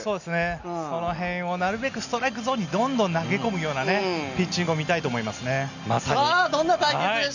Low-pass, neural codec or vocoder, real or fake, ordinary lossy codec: 7.2 kHz; none; real; none